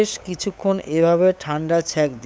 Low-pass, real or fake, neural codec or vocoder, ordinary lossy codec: none; fake; codec, 16 kHz, 8 kbps, FunCodec, trained on LibriTTS, 25 frames a second; none